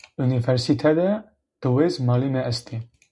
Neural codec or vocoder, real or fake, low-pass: none; real; 10.8 kHz